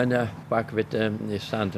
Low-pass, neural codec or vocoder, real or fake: 14.4 kHz; none; real